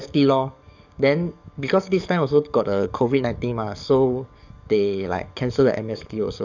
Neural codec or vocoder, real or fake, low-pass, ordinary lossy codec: codec, 44.1 kHz, 7.8 kbps, DAC; fake; 7.2 kHz; none